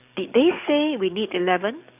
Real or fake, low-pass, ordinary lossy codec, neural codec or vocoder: fake; 3.6 kHz; none; codec, 44.1 kHz, 7.8 kbps, DAC